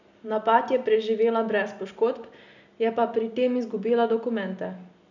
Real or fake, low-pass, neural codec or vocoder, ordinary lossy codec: real; 7.2 kHz; none; none